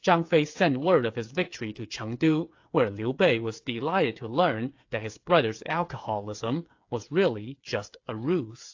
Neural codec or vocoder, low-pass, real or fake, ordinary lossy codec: codec, 16 kHz, 8 kbps, FreqCodec, smaller model; 7.2 kHz; fake; AAC, 48 kbps